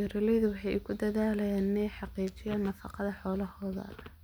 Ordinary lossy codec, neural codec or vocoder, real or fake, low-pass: none; none; real; none